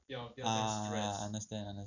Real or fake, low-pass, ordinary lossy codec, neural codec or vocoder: real; 7.2 kHz; none; none